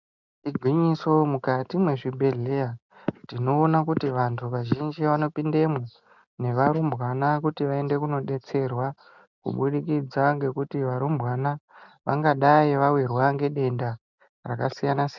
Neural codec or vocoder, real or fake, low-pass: none; real; 7.2 kHz